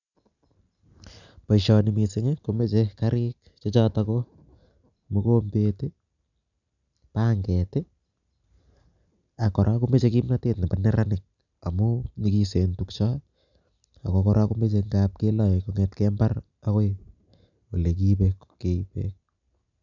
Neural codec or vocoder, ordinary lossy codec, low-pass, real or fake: none; none; 7.2 kHz; real